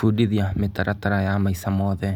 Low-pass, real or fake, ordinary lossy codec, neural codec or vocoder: none; real; none; none